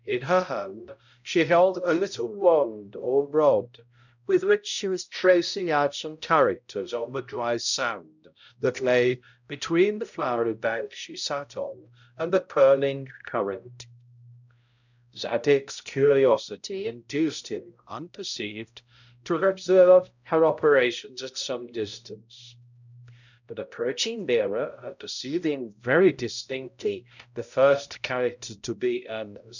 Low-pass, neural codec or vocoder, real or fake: 7.2 kHz; codec, 16 kHz, 0.5 kbps, X-Codec, HuBERT features, trained on balanced general audio; fake